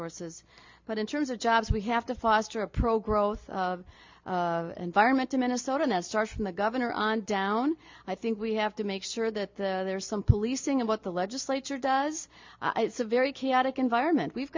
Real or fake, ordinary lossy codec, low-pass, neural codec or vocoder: real; MP3, 48 kbps; 7.2 kHz; none